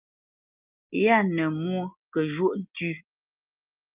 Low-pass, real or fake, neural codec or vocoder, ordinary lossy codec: 3.6 kHz; real; none; Opus, 24 kbps